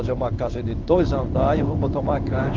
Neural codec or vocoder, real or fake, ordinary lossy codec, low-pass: codec, 16 kHz in and 24 kHz out, 1 kbps, XY-Tokenizer; fake; Opus, 24 kbps; 7.2 kHz